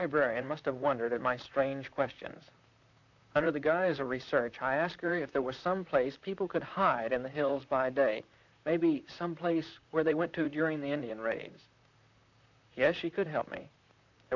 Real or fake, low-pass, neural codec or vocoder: fake; 7.2 kHz; vocoder, 44.1 kHz, 128 mel bands, Pupu-Vocoder